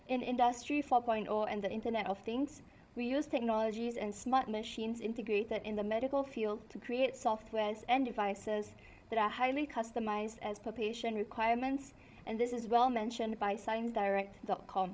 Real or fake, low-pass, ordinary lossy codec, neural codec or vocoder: fake; none; none; codec, 16 kHz, 16 kbps, FreqCodec, larger model